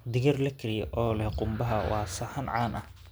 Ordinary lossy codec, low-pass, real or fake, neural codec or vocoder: none; none; real; none